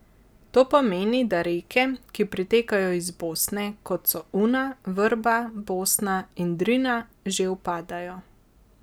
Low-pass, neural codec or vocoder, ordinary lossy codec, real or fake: none; none; none; real